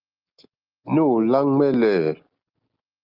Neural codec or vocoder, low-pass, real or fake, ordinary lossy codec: none; 5.4 kHz; real; Opus, 32 kbps